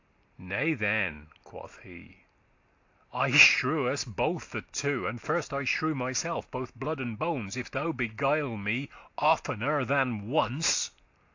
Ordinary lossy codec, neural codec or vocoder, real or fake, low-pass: AAC, 48 kbps; none; real; 7.2 kHz